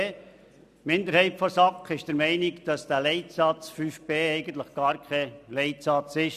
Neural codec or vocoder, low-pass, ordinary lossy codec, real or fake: none; 14.4 kHz; none; real